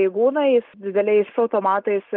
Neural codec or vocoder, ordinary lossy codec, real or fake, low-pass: none; Opus, 32 kbps; real; 5.4 kHz